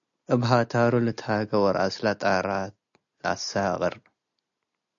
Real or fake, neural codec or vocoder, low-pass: real; none; 7.2 kHz